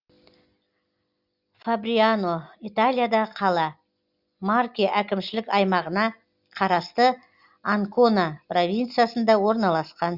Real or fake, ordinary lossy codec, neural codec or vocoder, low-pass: real; Opus, 64 kbps; none; 5.4 kHz